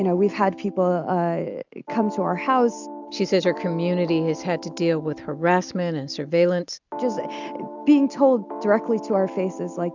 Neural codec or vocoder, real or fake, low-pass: none; real; 7.2 kHz